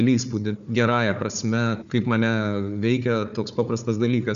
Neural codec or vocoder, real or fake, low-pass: codec, 16 kHz, 4 kbps, FunCodec, trained on Chinese and English, 50 frames a second; fake; 7.2 kHz